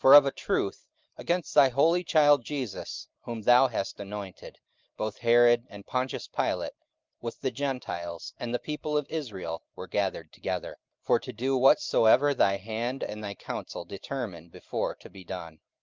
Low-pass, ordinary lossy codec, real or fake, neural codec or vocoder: 7.2 kHz; Opus, 24 kbps; real; none